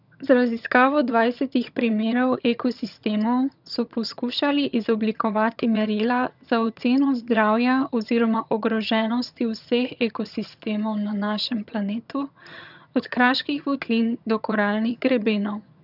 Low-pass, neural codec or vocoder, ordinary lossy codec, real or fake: 5.4 kHz; vocoder, 22.05 kHz, 80 mel bands, HiFi-GAN; none; fake